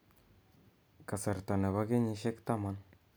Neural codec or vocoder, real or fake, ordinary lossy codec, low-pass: none; real; none; none